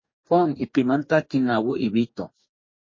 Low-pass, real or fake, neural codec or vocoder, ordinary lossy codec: 7.2 kHz; fake; codec, 44.1 kHz, 2.6 kbps, DAC; MP3, 32 kbps